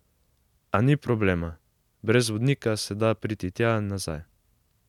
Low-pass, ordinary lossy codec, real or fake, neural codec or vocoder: 19.8 kHz; none; real; none